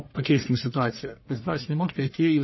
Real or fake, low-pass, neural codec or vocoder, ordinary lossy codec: fake; 7.2 kHz; codec, 44.1 kHz, 1.7 kbps, Pupu-Codec; MP3, 24 kbps